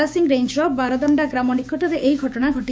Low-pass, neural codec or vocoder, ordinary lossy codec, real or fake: none; codec, 16 kHz, 6 kbps, DAC; none; fake